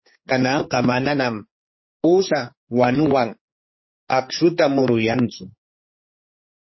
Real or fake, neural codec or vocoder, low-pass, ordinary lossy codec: fake; codec, 16 kHz, 4 kbps, X-Codec, HuBERT features, trained on general audio; 7.2 kHz; MP3, 24 kbps